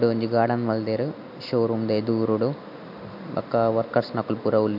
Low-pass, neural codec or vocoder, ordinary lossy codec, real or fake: 5.4 kHz; none; none; real